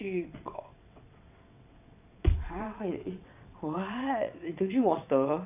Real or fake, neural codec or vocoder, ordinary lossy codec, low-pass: fake; vocoder, 22.05 kHz, 80 mel bands, WaveNeXt; none; 3.6 kHz